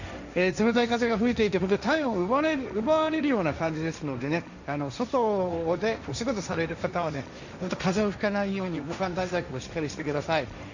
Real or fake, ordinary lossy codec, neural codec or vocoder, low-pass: fake; none; codec, 16 kHz, 1.1 kbps, Voila-Tokenizer; 7.2 kHz